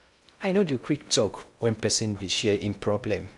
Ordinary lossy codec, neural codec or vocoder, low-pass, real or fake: none; codec, 16 kHz in and 24 kHz out, 0.6 kbps, FocalCodec, streaming, 2048 codes; 10.8 kHz; fake